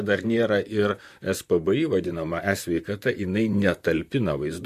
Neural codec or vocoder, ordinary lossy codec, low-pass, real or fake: vocoder, 44.1 kHz, 128 mel bands, Pupu-Vocoder; MP3, 64 kbps; 14.4 kHz; fake